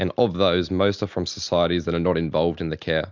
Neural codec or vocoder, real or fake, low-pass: none; real; 7.2 kHz